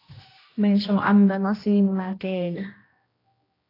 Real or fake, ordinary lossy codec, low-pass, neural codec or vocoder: fake; AAC, 24 kbps; 5.4 kHz; codec, 16 kHz, 1 kbps, X-Codec, HuBERT features, trained on general audio